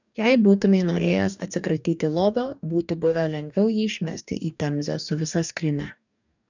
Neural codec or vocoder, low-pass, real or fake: codec, 44.1 kHz, 2.6 kbps, DAC; 7.2 kHz; fake